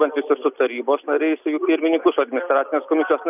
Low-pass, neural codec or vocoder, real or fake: 3.6 kHz; none; real